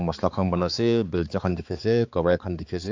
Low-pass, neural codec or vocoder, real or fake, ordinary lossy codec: 7.2 kHz; codec, 16 kHz, 4 kbps, X-Codec, HuBERT features, trained on balanced general audio; fake; AAC, 48 kbps